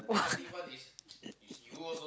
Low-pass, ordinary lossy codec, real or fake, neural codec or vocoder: none; none; real; none